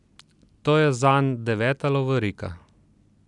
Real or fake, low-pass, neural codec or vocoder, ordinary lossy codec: real; 10.8 kHz; none; none